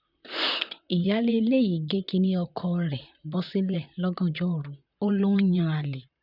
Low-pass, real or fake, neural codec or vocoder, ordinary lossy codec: 5.4 kHz; fake; vocoder, 44.1 kHz, 128 mel bands, Pupu-Vocoder; none